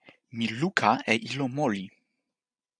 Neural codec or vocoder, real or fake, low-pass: none; real; 9.9 kHz